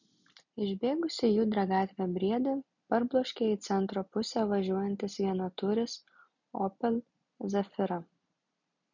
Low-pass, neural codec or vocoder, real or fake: 7.2 kHz; none; real